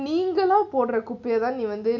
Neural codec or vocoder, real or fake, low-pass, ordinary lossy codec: none; real; 7.2 kHz; MP3, 64 kbps